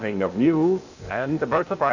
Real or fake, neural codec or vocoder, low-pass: fake; codec, 16 kHz, 0.8 kbps, ZipCodec; 7.2 kHz